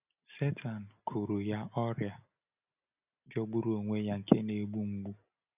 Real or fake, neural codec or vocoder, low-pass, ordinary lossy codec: real; none; 3.6 kHz; none